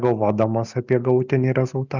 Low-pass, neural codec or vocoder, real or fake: 7.2 kHz; none; real